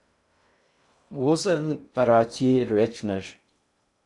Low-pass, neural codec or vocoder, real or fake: 10.8 kHz; codec, 16 kHz in and 24 kHz out, 0.6 kbps, FocalCodec, streaming, 2048 codes; fake